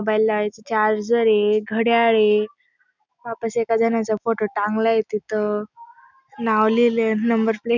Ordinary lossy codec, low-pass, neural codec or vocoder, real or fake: none; 7.2 kHz; none; real